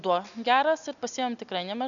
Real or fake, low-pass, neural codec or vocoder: real; 7.2 kHz; none